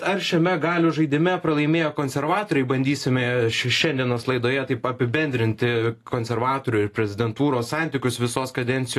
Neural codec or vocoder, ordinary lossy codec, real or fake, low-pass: none; AAC, 48 kbps; real; 14.4 kHz